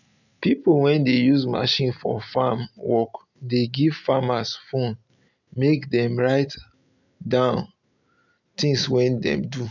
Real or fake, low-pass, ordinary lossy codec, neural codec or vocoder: real; 7.2 kHz; none; none